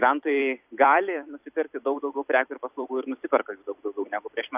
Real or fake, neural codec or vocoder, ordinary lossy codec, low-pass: fake; vocoder, 44.1 kHz, 128 mel bands every 256 samples, BigVGAN v2; AAC, 32 kbps; 3.6 kHz